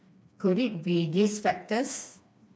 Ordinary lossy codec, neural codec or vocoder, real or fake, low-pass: none; codec, 16 kHz, 2 kbps, FreqCodec, smaller model; fake; none